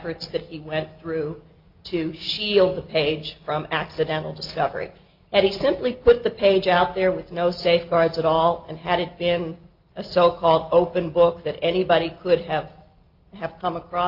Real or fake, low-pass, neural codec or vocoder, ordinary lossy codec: real; 5.4 kHz; none; Opus, 24 kbps